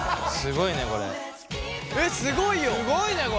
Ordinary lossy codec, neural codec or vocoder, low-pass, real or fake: none; none; none; real